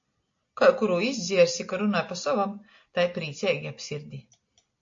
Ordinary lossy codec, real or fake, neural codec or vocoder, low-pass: AAC, 48 kbps; real; none; 7.2 kHz